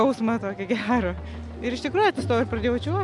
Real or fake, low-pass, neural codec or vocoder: real; 10.8 kHz; none